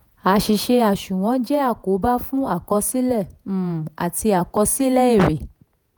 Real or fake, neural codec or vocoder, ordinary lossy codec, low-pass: fake; vocoder, 48 kHz, 128 mel bands, Vocos; none; none